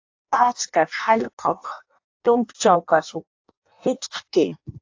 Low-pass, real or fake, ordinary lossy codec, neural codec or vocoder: 7.2 kHz; fake; AAC, 48 kbps; codec, 16 kHz in and 24 kHz out, 0.6 kbps, FireRedTTS-2 codec